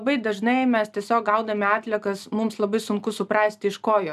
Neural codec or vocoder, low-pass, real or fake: none; 14.4 kHz; real